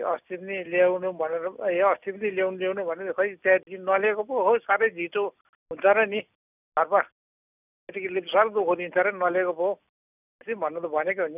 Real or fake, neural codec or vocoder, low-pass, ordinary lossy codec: real; none; 3.6 kHz; none